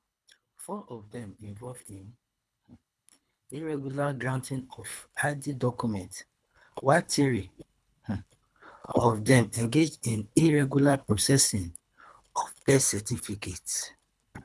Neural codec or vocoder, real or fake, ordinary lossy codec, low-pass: codec, 24 kHz, 3 kbps, HILCodec; fake; none; none